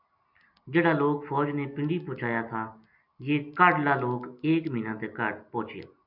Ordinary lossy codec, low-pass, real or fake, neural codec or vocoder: MP3, 48 kbps; 5.4 kHz; real; none